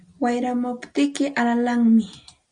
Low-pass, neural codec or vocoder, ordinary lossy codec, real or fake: 9.9 kHz; none; Opus, 64 kbps; real